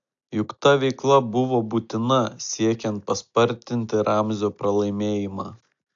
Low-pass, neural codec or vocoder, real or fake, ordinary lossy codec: 7.2 kHz; none; real; AAC, 64 kbps